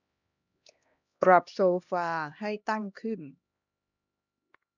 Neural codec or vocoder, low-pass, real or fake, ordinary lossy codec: codec, 16 kHz, 1 kbps, X-Codec, HuBERT features, trained on LibriSpeech; 7.2 kHz; fake; none